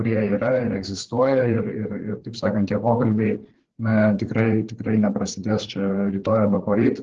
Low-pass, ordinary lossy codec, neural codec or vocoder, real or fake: 7.2 kHz; Opus, 16 kbps; codec, 16 kHz, 4 kbps, FreqCodec, larger model; fake